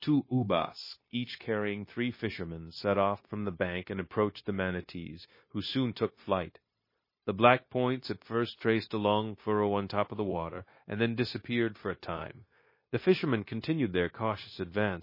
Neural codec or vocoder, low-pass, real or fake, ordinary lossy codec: codec, 16 kHz, 0.9 kbps, LongCat-Audio-Codec; 5.4 kHz; fake; MP3, 24 kbps